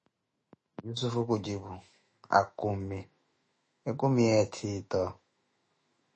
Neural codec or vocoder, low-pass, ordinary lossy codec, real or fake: autoencoder, 48 kHz, 128 numbers a frame, DAC-VAE, trained on Japanese speech; 10.8 kHz; MP3, 32 kbps; fake